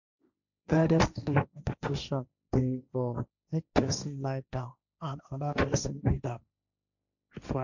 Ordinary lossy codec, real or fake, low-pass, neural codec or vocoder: none; fake; 7.2 kHz; codec, 16 kHz, 1.1 kbps, Voila-Tokenizer